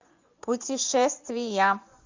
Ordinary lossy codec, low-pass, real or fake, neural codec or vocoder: MP3, 64 kbps; 7.2 kHz; fake; vocoder, 24 kHz, 100 mel bands, Vocos